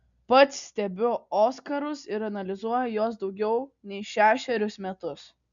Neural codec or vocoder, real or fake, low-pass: none; real; 7.2 kHz